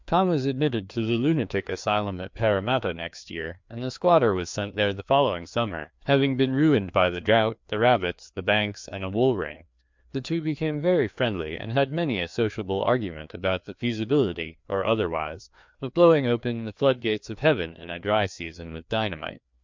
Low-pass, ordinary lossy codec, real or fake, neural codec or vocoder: 7.2 kHz; MP3, 64 kbps; fake; codec, 16 kHz, 2 kbps, FreqCodec, larger model